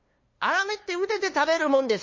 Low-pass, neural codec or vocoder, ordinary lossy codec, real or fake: 7.2 kHz; codec, 16 kHz, 2 kbps, FunCodec, trained on LibriTTS, 25 frames a second; MP3, 32 kbps; fake